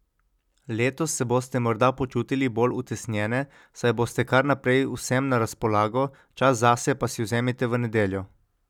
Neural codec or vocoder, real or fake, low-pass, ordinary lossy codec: none; real; 19.8 kHz; none